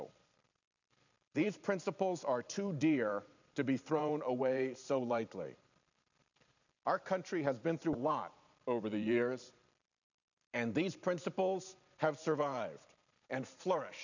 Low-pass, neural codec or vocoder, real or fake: 7.2 kHz; vocoder, 44.1 kHz, 128 mel bands every 512 samples, BigVGAN v2; fake